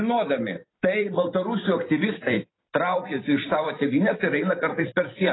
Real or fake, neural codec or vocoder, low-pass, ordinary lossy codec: fake; vocoder, 44.1 kHz, 128 mel bands every 256 samples, BigVGAN v2; 7.2 kHz; AAC, 16 kbps